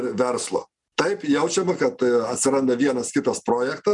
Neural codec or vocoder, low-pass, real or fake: none; 10.8 kHz; real